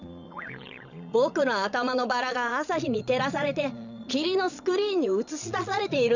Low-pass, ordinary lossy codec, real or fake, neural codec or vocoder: 7.2 kHz; none; fake; vocoder, 22.05 kHz, 80 mel bands, Vocos